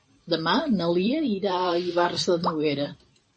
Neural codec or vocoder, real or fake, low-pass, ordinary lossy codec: vocoder, 44.1 kHz, 128 mel bands every 512 samples, BigVGAN v2; fake; 10.8 kHz; MP3, 32 kbps